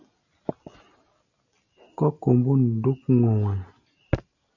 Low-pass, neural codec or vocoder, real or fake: 7.2 kHz; none; real